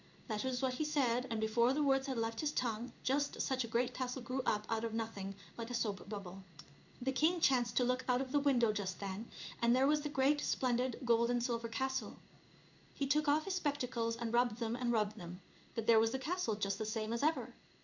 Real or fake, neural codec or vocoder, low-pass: fake; codec, 16 kHz in and 24 kHz out, 1 kbps, XY-Tokenizer; 7.2 kHz